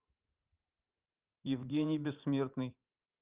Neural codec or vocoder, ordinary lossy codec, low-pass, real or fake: vocoder, 44.1 kHz, 80 mel bands, Vocos; Opus, 32 kbps; 3.6 kHz; fake